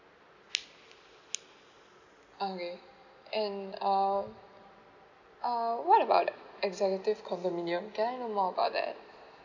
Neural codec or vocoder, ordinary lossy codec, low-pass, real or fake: none; none; 7.2 kHz; real